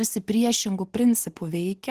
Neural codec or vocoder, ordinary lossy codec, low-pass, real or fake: none; Opus, 16 kbps; 14.4 kHz; real